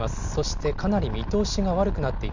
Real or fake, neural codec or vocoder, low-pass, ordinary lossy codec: real; none; 7.2 kHz; none